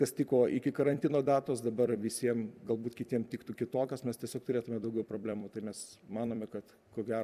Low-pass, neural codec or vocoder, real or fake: 14.4 kHz; vocoder, 44.1 kHz, 128 mel bands every 256 samples, BigVGAN v2; fake